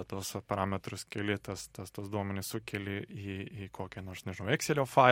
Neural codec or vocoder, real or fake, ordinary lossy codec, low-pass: none; real; MP3, 64 kbps; 19.8 kHz